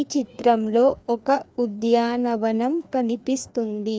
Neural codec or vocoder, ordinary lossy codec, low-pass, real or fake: codec, 16 kHz, 2 kbps, FreqCodec, larger model; none; none; fake